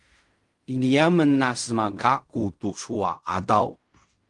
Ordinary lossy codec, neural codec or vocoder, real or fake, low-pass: Opus, 32 kbps; codec, 16 kHz in and 24 kHz out, 0.4 kbps, LongCat-Audio-Codec, fine tuned four codebook decoder; fake; 10.8 kHz